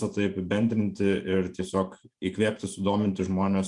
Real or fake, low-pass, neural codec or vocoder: fake; 10.8 kHz; vocoder, 44.1 kHz, 128 mel bands every 512 samples, BigVGAN v2